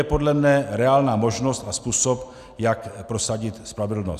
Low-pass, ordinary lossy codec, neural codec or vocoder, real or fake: 14.4 kHz; Opus, 64 kbps; none; real